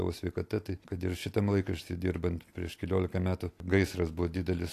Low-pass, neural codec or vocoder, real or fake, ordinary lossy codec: 14.4 kHz; none; real; AAC, 64 kbps